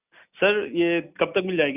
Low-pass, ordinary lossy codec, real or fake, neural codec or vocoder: 3.6 kHz; none; real; none